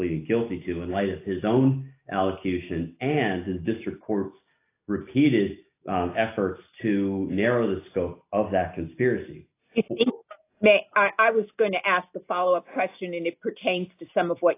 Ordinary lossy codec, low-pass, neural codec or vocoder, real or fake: AAC, 24 kbps; 3.6 kHz; none; real